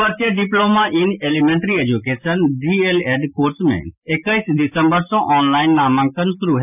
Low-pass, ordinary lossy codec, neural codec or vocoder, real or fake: 3.6 kHz; none; none; real